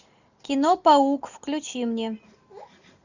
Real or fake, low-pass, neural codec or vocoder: real; 7.2 kHz; none